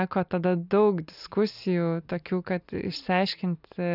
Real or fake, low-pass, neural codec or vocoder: real; 5.4 kHz; none